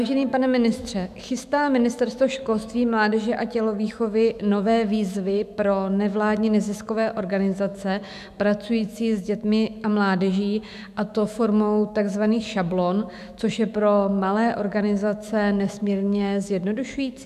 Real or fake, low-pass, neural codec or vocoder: fake; 14.4 kHz; autoencoder, 48 kHz, 128 numbers a frame, DAC-VAE, trained on Japanese speech